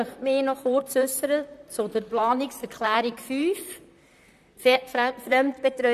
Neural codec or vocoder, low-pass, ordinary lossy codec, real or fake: vocoder, 44.1 kHz, 128 mel bands, Pupu-Vocoder; 14.4 kHz; none; fake